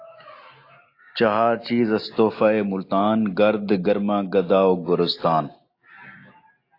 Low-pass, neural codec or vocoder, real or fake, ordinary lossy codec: 5.4 kHz; none; real; AAC, 32 kbps